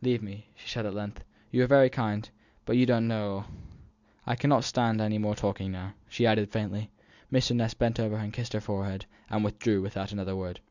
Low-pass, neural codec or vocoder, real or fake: 7.2 kHz; none; real